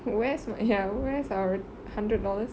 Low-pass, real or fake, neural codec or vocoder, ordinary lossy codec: none; real; none; none